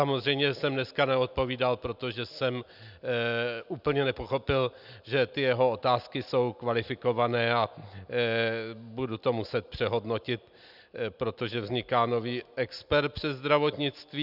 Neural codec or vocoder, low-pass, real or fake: none; 5.4 kHz; real